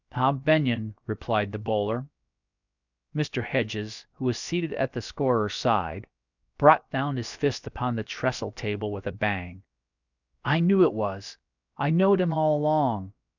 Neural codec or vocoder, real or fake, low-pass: codec, 16 kHz, about 1 kbps, DyCAST, with the encoder's durations; fake; 7.2 kHz